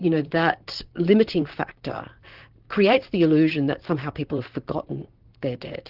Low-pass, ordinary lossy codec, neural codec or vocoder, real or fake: 5.4 kHz; Opus, 16 kbps; vocoder, 44.1 kHz, 128 mel bands, Pupu-Vocoder; fake